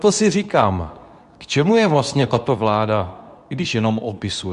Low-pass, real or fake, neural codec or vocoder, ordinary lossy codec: 10.8 kHz; fake; codec, 24 kHz, 0.9 kbps, WavTokenizer, medium speech release version 2; AAC, 96 kbps